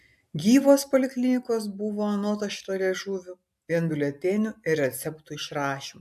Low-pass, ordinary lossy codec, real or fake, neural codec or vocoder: 14.4 kHz; AAC, 96 kbps; real; none